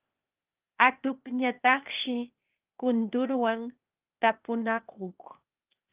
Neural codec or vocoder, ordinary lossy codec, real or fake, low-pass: codec, 16 kHz, 0.8 kbps, ZipCodec; Opus, 24 kbps; fake; 3.6 kHz